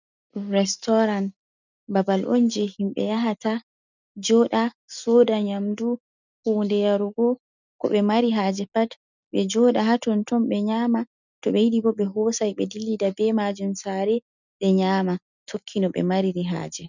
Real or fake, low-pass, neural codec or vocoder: real; 7.2 kHz; none